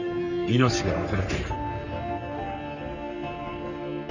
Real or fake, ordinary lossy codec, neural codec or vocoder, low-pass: fake; none; codec, 44.1 kHz, 3.4 kbps, Pupu-Codec; 7.2 kHz